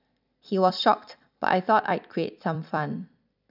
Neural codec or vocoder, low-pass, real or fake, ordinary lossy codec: vocoder, 44.1 kHz, 128 mel bands every 256 samples, BigVGAN v2; 5.4 kHz; fake; none